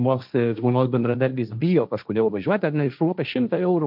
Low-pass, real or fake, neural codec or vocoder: 5.4 kHz; fake; codec, 16 kHz, 1.1 kbps, Voila-Tokenizer